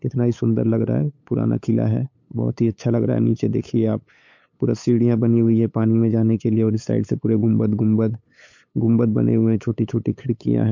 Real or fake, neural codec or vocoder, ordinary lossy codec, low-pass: fake; codec, 16 kHz, 8 kbps, FunCodec, trained on LibriTTS, 25 frames a second; MP3, 48 kbps; 7.2 kHz